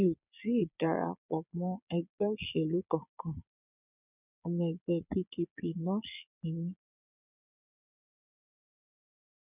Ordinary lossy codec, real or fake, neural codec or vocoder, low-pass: none; fake; vocoder, 22.05 kHz, 80 mel bands, Vocos; 3.6 kHz